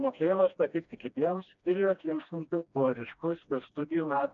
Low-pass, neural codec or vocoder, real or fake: 7.2 kHz; codec, 16 kHz, 1 kbps, FreqCodec, smaller model; fake